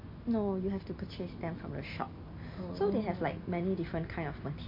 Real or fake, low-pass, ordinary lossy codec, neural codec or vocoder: real; 5.4 kHz; MP3, 32 kbps; none